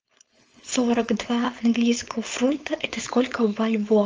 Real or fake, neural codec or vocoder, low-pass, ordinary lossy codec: fake; codec, 16 kHz, 4.8 kbps, FACodec; 7.2 kHz; Opus, 24 kbps